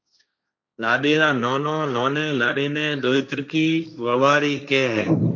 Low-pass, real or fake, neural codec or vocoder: 7.2 kHz; fake; codec, 16 kHz, 1.1 kbps, Voila-Tokenizer